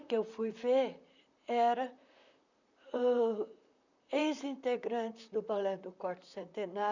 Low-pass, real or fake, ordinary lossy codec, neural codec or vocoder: 7.2 kHz; fake; none; vocoder, 44.1 kHz, 128 mel bands, Pupu-Vocoder